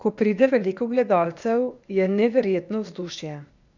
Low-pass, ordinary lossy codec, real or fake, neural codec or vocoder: 7.2 kHz; none; fake; codec, 16 kHz, 0.8 kbps, ZipCodec